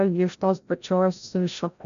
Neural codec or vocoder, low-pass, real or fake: codec, 16 kHz, 0.5 kbps, FreqCodec, larger model; 7.2 kHz; fake